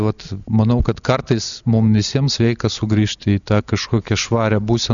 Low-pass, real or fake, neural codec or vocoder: 7.2 kHz; real; none